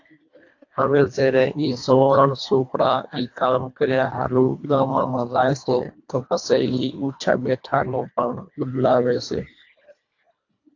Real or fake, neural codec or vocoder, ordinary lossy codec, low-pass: fake; codec, 24 kHz, 1.5 kbps, HILCodec; AAC, 48 kbps; 7.2 kHz